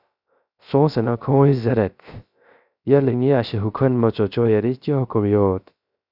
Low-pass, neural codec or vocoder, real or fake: 5.4 kHz; codec, 16 kHz, 0.3 kbps, FocalCodec; fake